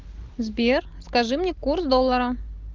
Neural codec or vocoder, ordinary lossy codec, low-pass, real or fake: none; Opus, 24 kbps; 7.2 kHz; real